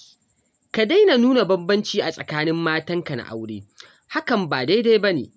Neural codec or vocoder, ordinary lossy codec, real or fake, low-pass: none; none; real; none